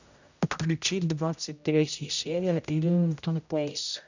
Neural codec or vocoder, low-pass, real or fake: codec, 16 kHz, 0.5 kbps, X-Codec, HuBERT features, trained on general audio; 7.2 kHz; fake